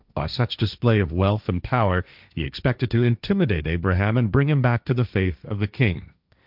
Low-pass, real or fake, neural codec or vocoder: 5.4 kHz; fake; codec, 16 kHz, 1.1 kbps, Voila-Tokenizer